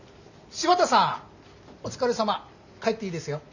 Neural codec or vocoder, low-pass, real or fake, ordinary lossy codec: none; 7.2 kHz; real; none